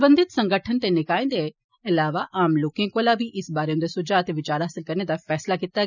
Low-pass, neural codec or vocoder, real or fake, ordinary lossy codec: 7.2 kHz; none; real; none